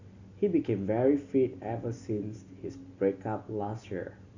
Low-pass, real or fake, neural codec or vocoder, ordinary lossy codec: 7.2 kHz; real; none; Opus, 64 kbps